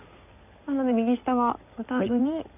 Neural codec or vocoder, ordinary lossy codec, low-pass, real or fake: codec, 16 kHz in and 24 kHz out, 2.2 kbps, FireRedTTS-2 codec; none; 3.6 kHz; fake